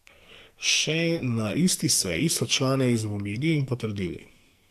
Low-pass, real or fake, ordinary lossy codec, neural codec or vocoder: 14.4 kHz; fake; AAC, 96 kbps; codec, 44.1 kHz, 2.6 kbps, SNAC